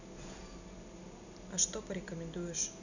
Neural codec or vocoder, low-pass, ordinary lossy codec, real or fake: none; 7.2 kHz; none; real